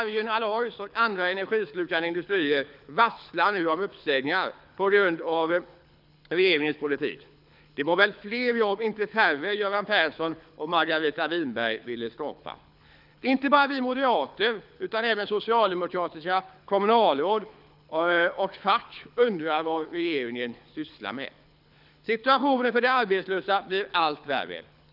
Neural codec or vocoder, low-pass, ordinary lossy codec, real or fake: codec, 24 kHz, 6 kbps, HILCodec; 5.4 kHz; none; fake